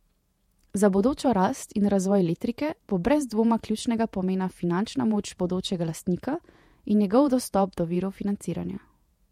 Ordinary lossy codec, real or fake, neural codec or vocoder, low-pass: MP3, 64 kbps; fake; vocoder, 48 kHz, 128 mel bands, Vocos; 19.8 kHz